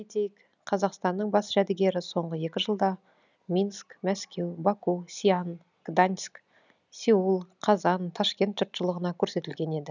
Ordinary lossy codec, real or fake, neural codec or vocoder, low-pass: none; real; none; 7.2 kHz